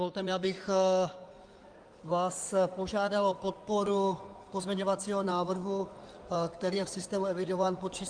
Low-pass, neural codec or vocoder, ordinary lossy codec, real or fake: 9.9 kHz; codec, 16 kHz in and 24 kHz out, 2.2 kbps, FireRedTTS-2 codec; Opus, 32 kbps; fake